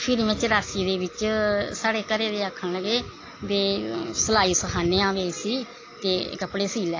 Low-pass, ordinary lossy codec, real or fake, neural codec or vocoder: 7.2 kHz; AAC, 32 kbps; real; none